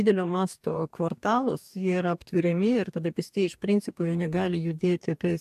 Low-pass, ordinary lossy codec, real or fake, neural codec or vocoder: 14.4 kHz; AAC, 96 kbps; fake; codec, 44.1 kHz, 2.6 kbps, DAC